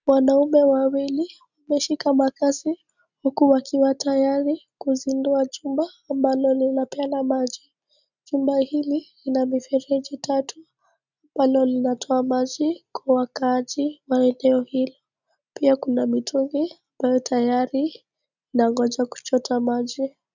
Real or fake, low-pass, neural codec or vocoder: real; 7.2 kHz; none